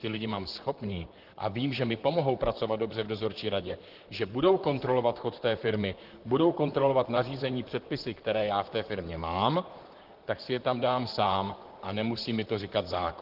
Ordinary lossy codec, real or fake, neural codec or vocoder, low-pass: Opus, 16 kbps; fake; vocoder, 44.1 kHz, 128 mel bands, Pupu-Vocoder; 5.4 kHz